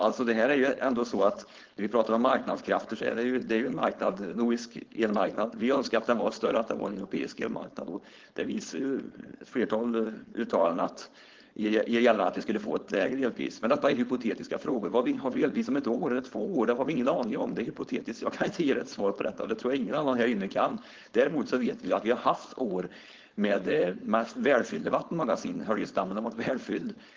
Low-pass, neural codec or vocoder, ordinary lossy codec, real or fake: 7.2 kHz; codec, 16 kHz, 4.8 kbps, FACodec; Opus, 16 kbps; fake